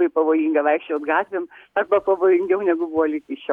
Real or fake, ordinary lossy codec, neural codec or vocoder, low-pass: real; MP3, 96 kbps; none; 19.8 kHz